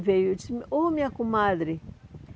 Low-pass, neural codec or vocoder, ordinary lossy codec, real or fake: none; none; none; real